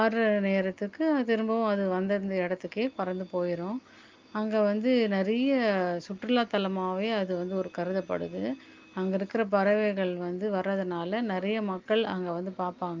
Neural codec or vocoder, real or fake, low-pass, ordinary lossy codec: none; real; 7.2 kHz; Opus, 24 kbps